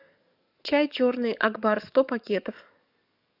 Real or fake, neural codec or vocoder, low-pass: fake; codec, 44.1 kHz, 7.8 kbps, DAC; 5.4 kHz